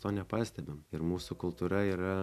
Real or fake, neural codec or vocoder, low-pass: real; none; 14.4 kHz